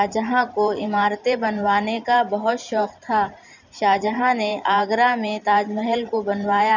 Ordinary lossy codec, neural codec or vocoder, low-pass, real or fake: none; vocoder, 44.1 kHz, 128 mel bands every 512 samples, BigVGAN v2; 7.2 kHz; fake